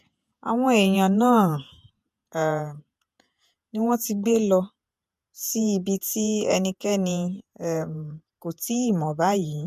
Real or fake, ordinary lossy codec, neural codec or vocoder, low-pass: fake; MP3, 96 kbps; vocoder, 48 kHz, 128 mel bands, Vocos; 14.4 kHz